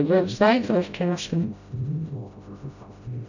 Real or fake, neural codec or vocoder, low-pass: fake; codec, 16 kHz, 0.5 kbps, FreqCodec, smaller model; 7.2 kHz